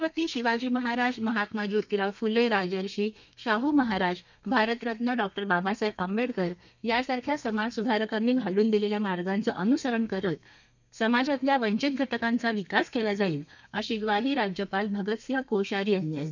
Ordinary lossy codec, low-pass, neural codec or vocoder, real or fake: none; 7.2 kHz; codec, 32 kHz, 1.9 kbps, SNAC; fake